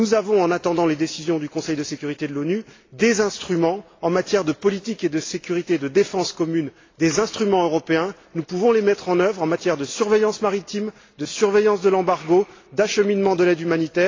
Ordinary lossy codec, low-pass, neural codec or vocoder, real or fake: AAC, 32 kbps; 7.2 kHz; none; real